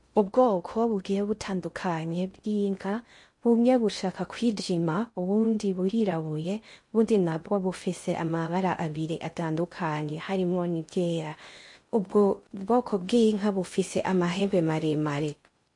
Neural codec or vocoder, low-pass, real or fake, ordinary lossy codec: codec, 16 kHz in and 24 kHz out, 0.6 kbps, FocalCodec, streaming, 2048 codes; 10.8 kHz; fake; MP3, 48 kbps